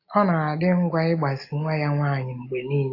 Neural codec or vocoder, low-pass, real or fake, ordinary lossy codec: none; 5.4 kHz; real; AAC, 32 kbps